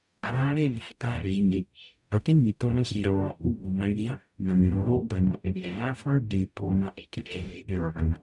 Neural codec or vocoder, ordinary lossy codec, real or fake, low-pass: codec, 44.1 kHz, 0.9 kbps, DAC; none; fake; 10.8 kHz